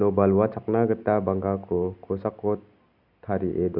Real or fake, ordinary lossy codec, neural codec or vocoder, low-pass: real; none; none; 5.4 kHz